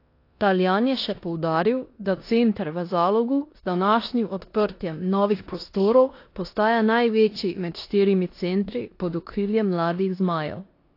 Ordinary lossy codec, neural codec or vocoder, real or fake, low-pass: AAC, 32 kbps; codec, 16 kHz in and 24 kHz out, 0.9 kbps, LongCat-Audio-Codec, four codebook decoder; fake; 5.4 kHz